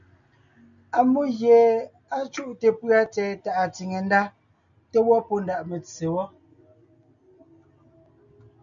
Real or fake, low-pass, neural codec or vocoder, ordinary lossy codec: real; 7.2 kHz; none; MP3, 96 kbps